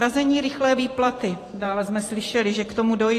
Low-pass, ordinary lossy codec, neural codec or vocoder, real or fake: 14.4 kHz; AAC, 48 kbps; vocoder, 44.1 kHz, 128 mel bands every 512 samples, BigVGAN v2; fake